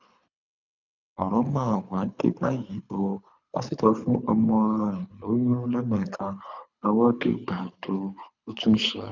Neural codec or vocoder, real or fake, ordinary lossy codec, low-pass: codec, 24 kHz, 3 kbps, HILCodec; fake; none; 7.2 kHz